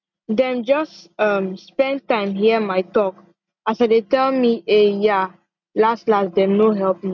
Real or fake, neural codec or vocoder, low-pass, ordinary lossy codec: real; none; 7.2 kHz; none